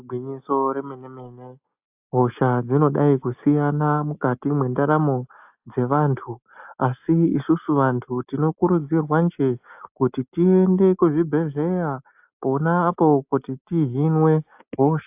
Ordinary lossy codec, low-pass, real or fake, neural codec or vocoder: AAC, 32 kbps; 3.6 kHz; real; none